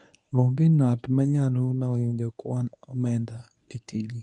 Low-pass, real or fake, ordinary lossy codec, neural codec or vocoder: 10.8 kHz; fake; none; codec, 24 kHz, 0.9 kbps, WavTokenizer, medium speech release version 1